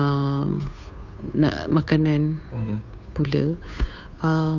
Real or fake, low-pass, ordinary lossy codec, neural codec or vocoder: fake; 7.2 kHz; none; codec, 16 kHz, 2 kbps, FunCodec, trained on Chinese and English, 25 frames a second